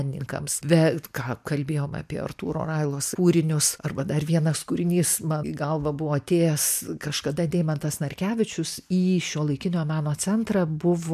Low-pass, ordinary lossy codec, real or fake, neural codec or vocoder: 14.4 kHz; MP3, 96 kbps; real; none